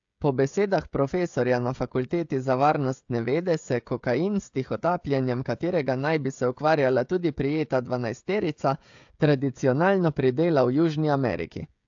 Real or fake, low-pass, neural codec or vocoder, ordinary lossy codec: fake; 7.2 kHz; codec, 16 kHz, 16 kbps, FreqCodec, smaller model; AAC, 64 kbps